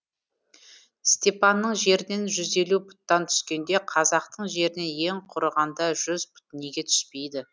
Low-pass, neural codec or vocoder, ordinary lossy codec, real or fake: none; none; none; real